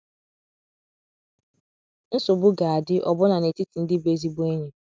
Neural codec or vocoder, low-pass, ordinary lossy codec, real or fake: none; none; none; real